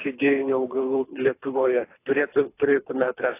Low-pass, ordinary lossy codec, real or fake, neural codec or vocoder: 3.6 kHz; AAC, 24 kbps; fake; codec, 24 kHz, 3 kbps, HILCodec